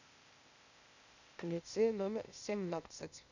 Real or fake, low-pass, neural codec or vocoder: fake; 7.2 kHz; codec, 16 kHz, 0.8 kbps, ZipCodec